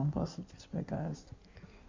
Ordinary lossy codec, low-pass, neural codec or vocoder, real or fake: MP3, 48 kbps; 7.2 kHz; codec, 16 kHz in and 24 kHz out, 1.1 kbps, FireRedTTS-2 codec; fake